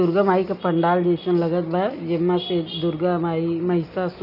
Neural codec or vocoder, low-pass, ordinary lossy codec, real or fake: none; 5.4 kHz; none; real